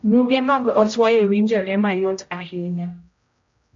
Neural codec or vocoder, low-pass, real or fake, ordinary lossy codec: codec, 16 kHz, 0.5 kbps, X-Codec, HuBERT features, trained on general audio; 7.2 kHz; fake; AAC, 64 kbps